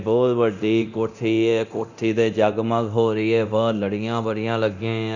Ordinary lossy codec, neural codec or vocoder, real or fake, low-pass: none; codec, 24 kHz, 0.9 kbps, DualCodec; fake; 7.2 kHz